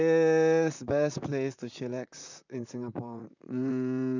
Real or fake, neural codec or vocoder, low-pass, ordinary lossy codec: fake; vocoder, 44.1 kHz, 128 mel bands, Pupu-Vocoder; 7.2 kHz; none